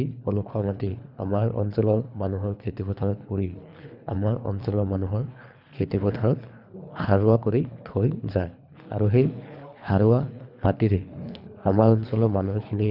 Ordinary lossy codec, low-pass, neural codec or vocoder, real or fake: none; 5.4 kHz; codec, 24 kHz, 3 kbps, HILCodec; fake